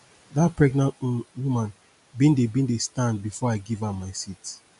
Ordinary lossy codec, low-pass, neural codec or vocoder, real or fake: none; 10.8 kHz; none; real